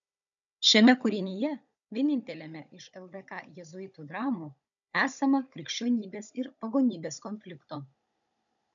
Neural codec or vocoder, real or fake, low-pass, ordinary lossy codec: codec, 16 kHz, 16 kbps, FunCodec, trained on Chinese and English, 50 frames a second; fake; 7.2 kHz; MP3, 96 kbps